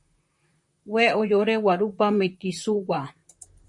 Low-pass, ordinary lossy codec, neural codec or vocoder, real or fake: 10.8 kHz; MP3, 48 kbps; vocoder, 44.1 kHz, 128 mel bands, Pupu-Vocoder; fake